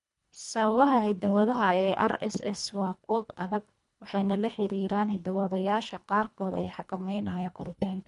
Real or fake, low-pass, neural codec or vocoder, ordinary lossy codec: fake; 10.8 kHz; codec, 24 kHz, 1.5 kbps, HILCodec; MP3, 64 kbps